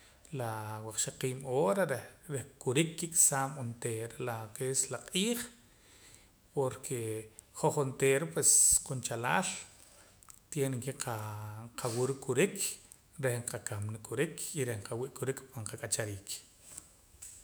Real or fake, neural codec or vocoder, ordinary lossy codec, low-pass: fake; autoencoder, 48 kHz, 128 numbers a frame, DAC-VAE, trained on Japanese speech; none; none